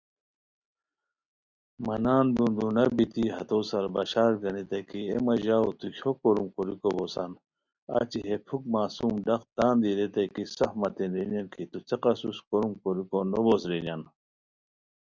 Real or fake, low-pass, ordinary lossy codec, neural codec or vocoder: real; 7.2 kHz; Opus, 64 kbps; none